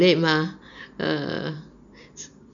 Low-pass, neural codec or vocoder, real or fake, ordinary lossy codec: 7.2 kHz; none; real; none